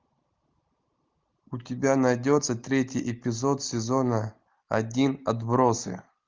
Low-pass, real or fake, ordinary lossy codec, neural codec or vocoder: 7.2 kHz; real; Opus, 32 kbps; none